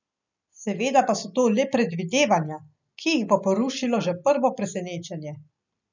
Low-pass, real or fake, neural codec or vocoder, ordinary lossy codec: 7.2 kHz; real; none; none